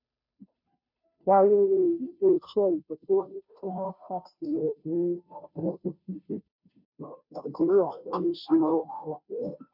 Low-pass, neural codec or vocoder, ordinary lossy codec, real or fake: 5.4 kHz; codec, 16 kHz, 0.5 kbps, FunCodec, trained on Chinese and English, 25 frames a second; none; fake